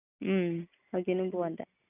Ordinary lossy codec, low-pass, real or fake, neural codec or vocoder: none; 3.6 kHz; real; none